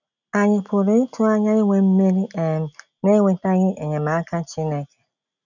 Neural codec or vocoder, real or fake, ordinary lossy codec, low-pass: none; real; none; 7.2 kHz